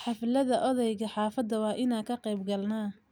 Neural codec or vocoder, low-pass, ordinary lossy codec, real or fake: none; none; none; real